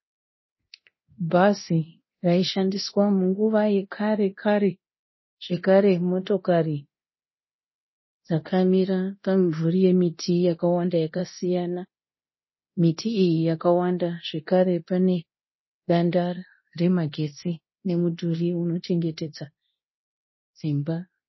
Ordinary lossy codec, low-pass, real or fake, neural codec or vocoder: MP3, 24 kbps; 7.2 kHz; fake; codec, 24 kHz, 0.9 kbps, DualCodec